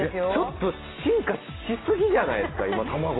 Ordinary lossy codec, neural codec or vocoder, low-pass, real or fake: AAC, 16 kbps; none; 7.2 kHz; real